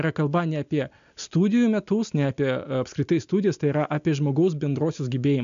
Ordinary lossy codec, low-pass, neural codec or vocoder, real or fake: MP3, 64 kbps; 7.2 kHz; none; real